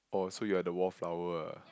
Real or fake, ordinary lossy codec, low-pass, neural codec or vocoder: real; none; none; none